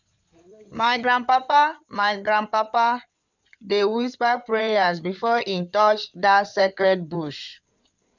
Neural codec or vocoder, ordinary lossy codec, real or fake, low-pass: codec, 16 kHz in and 24 kHz out, 2.2 kbps, FireRedTTS-2 codec; none; fake; 7.2 kHz